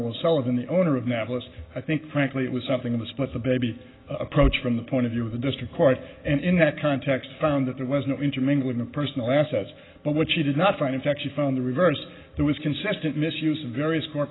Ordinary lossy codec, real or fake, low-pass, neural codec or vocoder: AAC, 16 kbps; real; 7.2 kHz; none